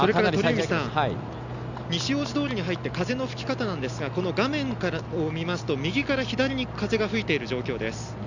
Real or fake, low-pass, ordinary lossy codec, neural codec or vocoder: real; 7.2 kHz; none; none